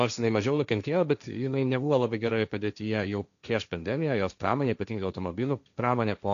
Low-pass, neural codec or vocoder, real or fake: 7.2 kHz; codec, 16 kHz, 1.1 kbps, Voila-Tokenizer; fake